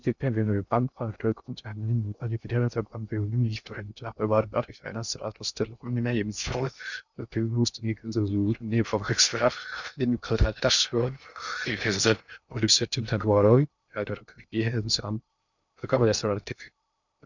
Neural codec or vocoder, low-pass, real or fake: codec, 16 kHz in and 24 kHz out, 0.6 kbps, FocalCodec, streaming, 2048 codes; 7.2 kHz; fake